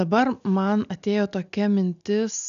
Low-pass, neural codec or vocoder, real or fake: 7.2 kHz; none; real